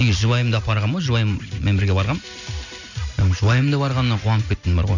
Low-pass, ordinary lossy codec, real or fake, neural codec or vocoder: 7.2 kHz; none; real; none